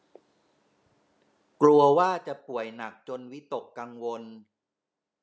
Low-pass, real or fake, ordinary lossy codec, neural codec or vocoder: none; real; none; none